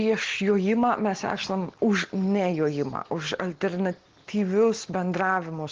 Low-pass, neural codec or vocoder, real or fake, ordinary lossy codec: 7.2 kHz; none; real; Opus, 16 kbps